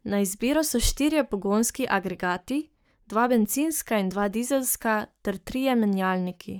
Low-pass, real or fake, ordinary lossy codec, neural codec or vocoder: none; fake; none; codec, 44.1 kHz, 7.8 kbps, Pupu-Codec